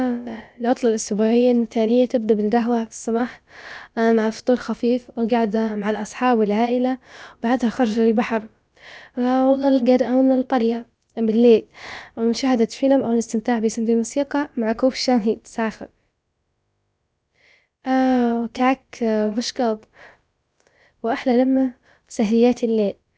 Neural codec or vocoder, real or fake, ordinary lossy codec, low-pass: codec, 16 kHz, about 1 kbps, DyCAST, with the encoder's durations; fake; none; none